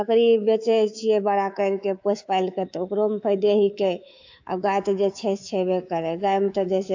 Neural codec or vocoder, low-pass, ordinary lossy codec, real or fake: codec, 24 kHz, 3.1 kbps, DualCodec; 7.2 kHz; AAC, 48 kbps; fake